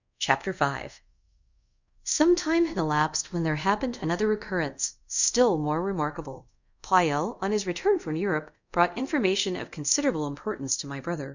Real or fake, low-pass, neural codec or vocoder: fake; 7.2 kHz; codec, 24 kHz, 0.5 kbps, DualCodec